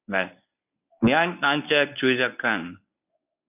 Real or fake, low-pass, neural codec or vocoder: fake; 3.6 kHz; codec, 24 kHz, 0.9 kbps, WavTokenizer, medium speech release version 1